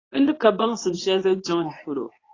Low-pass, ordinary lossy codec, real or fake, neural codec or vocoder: 7.2 kHz; AAC, 32 kbps; fake; codec, 24 kHz, 0.9 kbps, WavTokenizer, medium speech release version 2